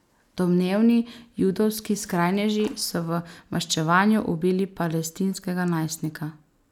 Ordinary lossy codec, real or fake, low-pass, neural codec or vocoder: none; real; 19.8 kHz; none